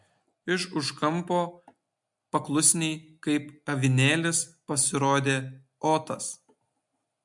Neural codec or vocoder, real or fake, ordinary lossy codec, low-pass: none; real; MP3, 64 kbps; 10.8 kHz